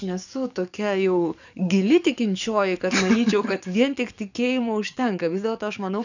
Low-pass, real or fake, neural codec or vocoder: 7.2 kHz; fake; codec, 44.1 kHz, 7.8 kbps, DAC